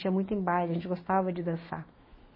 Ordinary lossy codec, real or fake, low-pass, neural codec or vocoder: MP3, 24 kbps; fake; 5.4 kHz; autoencoder, 48 kHz, 128 numbers a frame, DAC-VAE, trained on Japanese speech